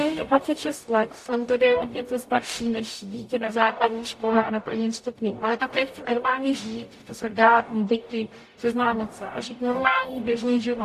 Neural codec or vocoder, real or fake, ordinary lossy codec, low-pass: codec, 44.1 kHz, 0.9 kbps, DAC; fake; AAC, 64 kbps; 14.4 kHz